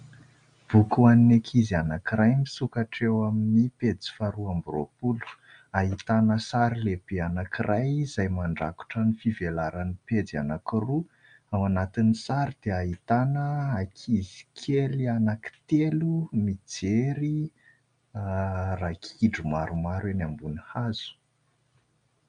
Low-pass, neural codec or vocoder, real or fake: 9.9 kHz; none; real